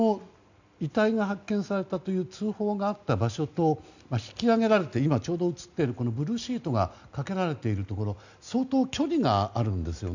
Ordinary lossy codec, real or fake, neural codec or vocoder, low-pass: none; real; none; 7.2 kHz